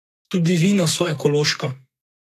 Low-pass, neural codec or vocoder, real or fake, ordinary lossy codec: 14.4 kHz; codec, 32 kHz, 1.9 kbps, SNAC; fake; AAC, 64 kbps